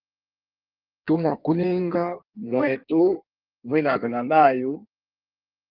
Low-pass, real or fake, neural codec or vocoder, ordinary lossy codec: 5.4 kHz; fake; codec, 16 kHz in and 24 kHz out, 1.1 kbps, FireRedTTS-2 codec; Opus, 32 kbps